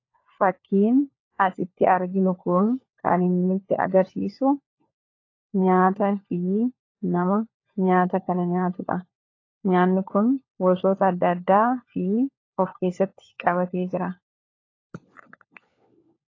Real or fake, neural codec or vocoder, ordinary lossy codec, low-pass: fake; codec, 16 kHz, 4 kbps, FunCodec, trained on LibriTTS, 50 frames a second; AAC, 32 kbps; 7.2 kHz